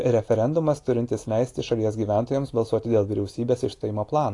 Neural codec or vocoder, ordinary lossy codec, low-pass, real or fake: none; AAC, 48 kbps; 10.8 kHz; real